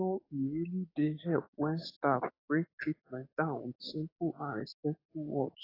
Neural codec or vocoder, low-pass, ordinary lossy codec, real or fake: codec, 44.1 kHz, 7.8 kbps, DAC; 5.4 kHz; AAC, 24 kbps; fake